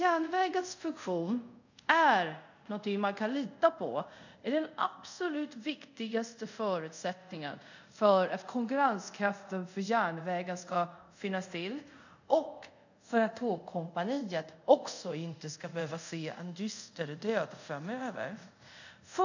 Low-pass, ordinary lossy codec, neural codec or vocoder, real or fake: 7.2 kHz; none; codec, 24 kHz, 0.5 kbps, DualCodec; fake